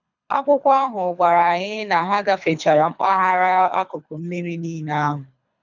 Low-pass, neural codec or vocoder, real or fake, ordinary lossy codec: 7.2 kHz; codec, 24 kHz, 3 kbps, HILCodec; fake; none